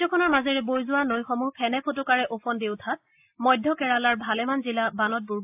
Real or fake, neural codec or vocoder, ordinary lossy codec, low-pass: real; none; AAC, 32 kbps; 3.6 kHz